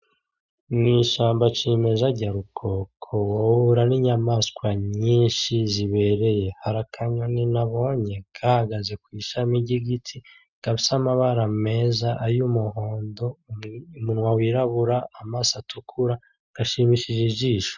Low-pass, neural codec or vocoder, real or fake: 7.2 kHz; none; real